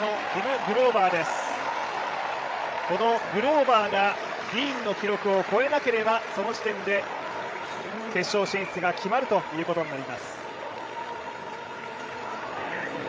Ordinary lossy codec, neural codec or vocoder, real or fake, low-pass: none; codec, 16 kHz, 8 kbps, FreqCodec, larger model; fake; none